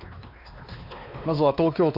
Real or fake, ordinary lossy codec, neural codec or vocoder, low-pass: fake; none; codec, 16 kHz, 2 kbps, X-Codec, WavLM features, trained on Multilingual LibriSpeech; 5.4 kHz